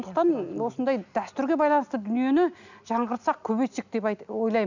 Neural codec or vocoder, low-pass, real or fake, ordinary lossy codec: none; 7.2 kHz; real; none